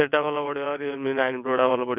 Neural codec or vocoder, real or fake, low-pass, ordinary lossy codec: vocoder, 22.05 kHz, 80 mel bands, WaveNeXt; fake; 3.6 kHz; none